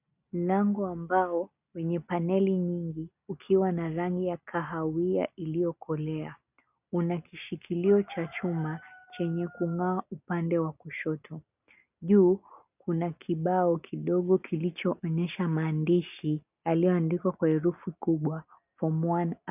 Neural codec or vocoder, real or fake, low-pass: none; real; 3.6 kHz